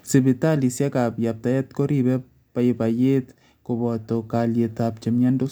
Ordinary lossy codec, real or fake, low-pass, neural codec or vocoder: none; real; none; none